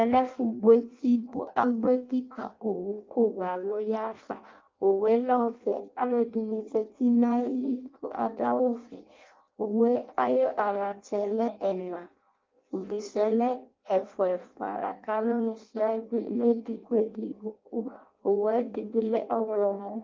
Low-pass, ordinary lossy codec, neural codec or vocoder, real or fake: 7.2 kHz; Opus, 32 kbps; codec, 16 kHz in and 24 kHz out, 0.6 kbps, FireRedTTS-2 codec; fake